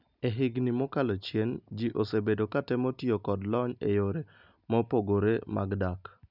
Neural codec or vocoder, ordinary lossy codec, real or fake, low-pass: none; none; real; 5.4 kHz